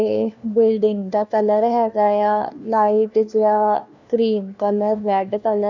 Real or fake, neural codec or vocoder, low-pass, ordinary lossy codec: fake; codec, 16 kHz, 1 kbps, FunCodec, trained on LibriTTS, 50 frames a second; 7.2 kHz; none